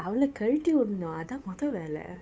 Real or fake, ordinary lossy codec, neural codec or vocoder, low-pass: fake; none; codec, 16 kHz, 8 kbps, FunCodec, trained on Chinese and English, 25 frames a second; none